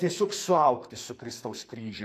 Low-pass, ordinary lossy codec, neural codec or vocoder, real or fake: 14.4 kHz; AAC, 64 kbps; codec, 44.1 kHz, 2.6 kbps, SNAC; fake